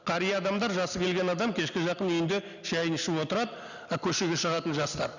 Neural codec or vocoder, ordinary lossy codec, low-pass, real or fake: none; none; 7.2 kHz; real